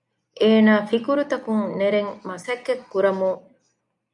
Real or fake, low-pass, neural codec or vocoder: real; 10.8 kHz; none